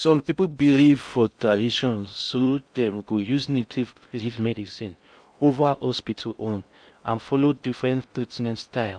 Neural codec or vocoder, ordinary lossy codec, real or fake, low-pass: codec, 16 kHz in and 24 kHz out, 0.6 kbps, FocalCodec, streaming, 4096 codes; none; fake; 9.9 kHz